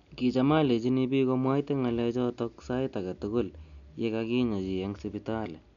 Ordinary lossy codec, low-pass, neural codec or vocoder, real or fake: none; 7.2 kHz; none; real